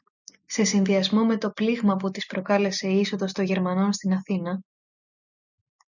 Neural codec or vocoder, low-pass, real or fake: none; 7.2 kHz; real